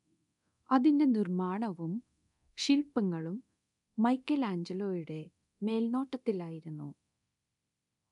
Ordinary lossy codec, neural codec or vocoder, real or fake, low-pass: none; codec, 24 kHz, 0.9 kbps, DualCodec; fake; 10.8 kHz